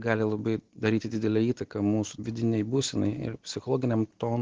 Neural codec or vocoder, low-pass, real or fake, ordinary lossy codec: none; 7.2 kHz; real; Opus, 16 kbps